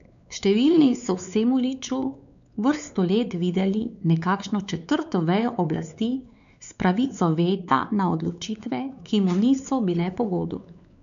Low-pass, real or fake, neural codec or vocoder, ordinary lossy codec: 7.2 kHz; fake; codec, 16 kHz, 4 kbps, X-Codec, WavLM features, trained on Multilingual LibriSpeech; none